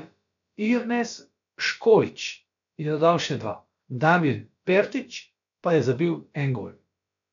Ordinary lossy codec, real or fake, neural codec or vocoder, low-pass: none; fake; codec, 16 kHz, about 1 kbps, DyCAST, with the encoder's durations; 7.2 kHz